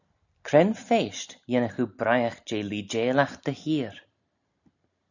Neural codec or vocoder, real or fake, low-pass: none; real; 7.2 kHz